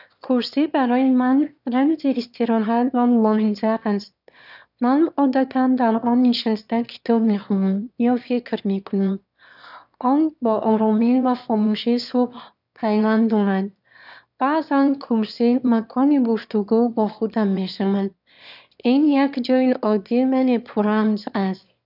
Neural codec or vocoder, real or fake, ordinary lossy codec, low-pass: autoencoder, 22.05 kHz, a latent of 192 numbers a frame, VITS, trained on one speaker; fake; none; 5.4 kHz